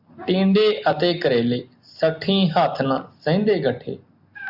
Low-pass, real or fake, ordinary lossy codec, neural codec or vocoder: 5.4 kHz; real; AAC, 48 kbps; none